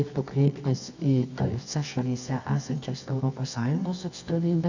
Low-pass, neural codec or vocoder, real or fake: 7.2 kHz; codec, 24 kHz, 0.9 kbps, WavTokenizer, medium music audio release; fake